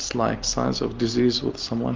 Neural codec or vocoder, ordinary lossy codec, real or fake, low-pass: none; Opus, 32 kbps; real; 7.2 kHz